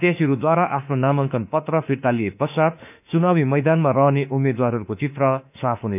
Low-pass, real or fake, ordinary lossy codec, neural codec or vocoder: 3.6 kHz; fake; none; autoencoder, 48 kHz, 32 numbers a frame, DAC-VAE, trained on Japanese speech